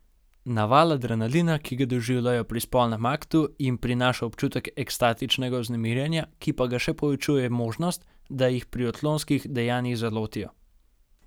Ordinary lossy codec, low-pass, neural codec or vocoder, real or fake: none; none; none; real